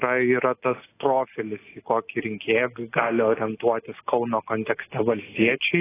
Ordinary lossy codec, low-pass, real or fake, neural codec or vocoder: AAC, 16 kbps; 3.6 kHz; real; none